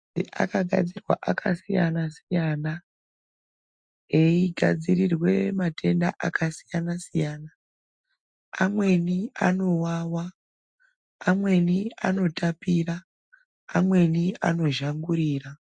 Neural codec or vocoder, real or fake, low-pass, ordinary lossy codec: none; real; 9.9 kHz; MP3, 64 kbps